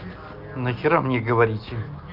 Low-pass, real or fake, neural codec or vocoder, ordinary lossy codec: 5.4 kHz; real; none; Opus, 24 kbps